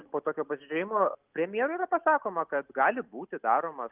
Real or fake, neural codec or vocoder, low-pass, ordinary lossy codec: real; none; 3.6 kHz; Opus, 24 kbps